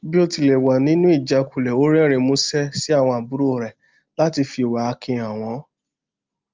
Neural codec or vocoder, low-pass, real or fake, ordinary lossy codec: none; 7.2 kHz; real; Opus, 32 kbps